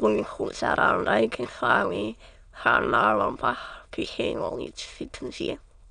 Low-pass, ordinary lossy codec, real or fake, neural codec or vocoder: 9.9 kHz; none; fake; autoencoder, 22.05 kHz, a latent of 192 numbers a frame, VITS, trained on many speakers